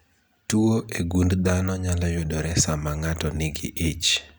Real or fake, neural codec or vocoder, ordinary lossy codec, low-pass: real; none; none; none